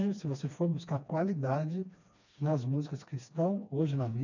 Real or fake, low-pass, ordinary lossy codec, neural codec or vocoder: fake; 7.2 kHz; none; codec, 16 kHz, 2 kbps, FreqCodec, smaller model